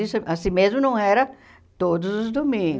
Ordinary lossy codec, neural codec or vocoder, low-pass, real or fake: none; none; none; real